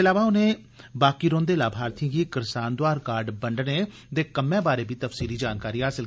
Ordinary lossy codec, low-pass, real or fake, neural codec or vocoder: none; none; real; none